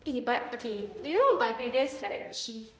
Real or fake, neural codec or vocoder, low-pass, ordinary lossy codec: fake; codec, 16 kHz, 0.5 kbps, X-Codec, HuBERT features, trained on balanced general audio; none; none